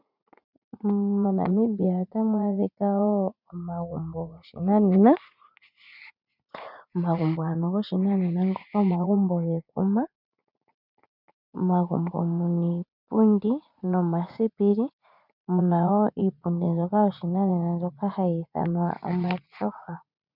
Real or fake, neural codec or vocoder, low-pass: fake; vocoder, 44.1 kHz, 80 mel bands, Vocos; 5.4 kHz